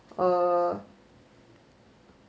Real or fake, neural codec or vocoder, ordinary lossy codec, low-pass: real; none; none; none